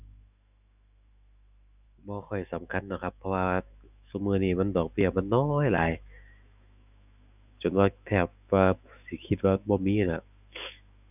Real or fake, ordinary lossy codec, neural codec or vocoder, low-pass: fake; none; autoencoder, 48 kHz, 128 numbers a frame, DAC-VAE, trained on Japanese speech; 3.6 kHz